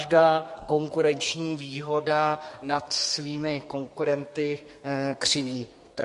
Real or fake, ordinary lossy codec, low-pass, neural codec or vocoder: fake; MP3, 48 kbps; 14.4 kHz; codec, 32 kHz, 1.9 kbps, SNAC